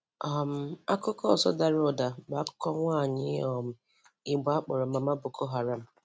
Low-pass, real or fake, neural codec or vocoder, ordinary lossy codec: none; real; none; none